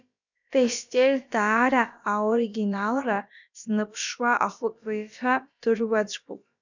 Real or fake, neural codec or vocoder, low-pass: fake; codec, 16 kHz, about 1 kbps, DyCAST, with the encoder's durations; 7.2 kHz